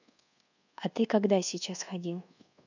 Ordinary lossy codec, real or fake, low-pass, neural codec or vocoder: none; fake; 7.2 kHz; codec, 24 kHz, 1.2 kbps, DualCodec